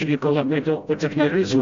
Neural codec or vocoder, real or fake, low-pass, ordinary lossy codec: codec, 16 kHz, 0.5 kbps, FreqCodec, smaller model; fake; 7.2 kHz; AAC, 64 kbps